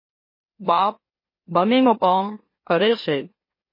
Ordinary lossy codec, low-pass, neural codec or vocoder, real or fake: MP3, 24 kbps; 5.4 kHz; autoencoder, 44.1 kHz, a latent of 192 numbers a frame, MeloTTS; fake